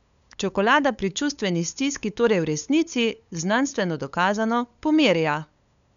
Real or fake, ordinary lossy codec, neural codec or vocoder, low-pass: fake; none; codec, 16 kHz, 8 kbps, FunCodec, trained on LibriTTS, 25 frames a second; 7.2 kHz